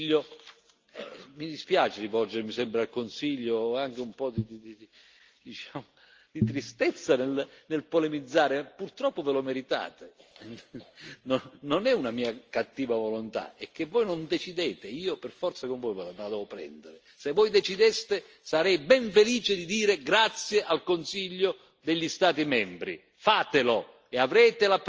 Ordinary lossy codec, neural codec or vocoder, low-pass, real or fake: Opus, 24 kbps; none; 7.2 kHz; real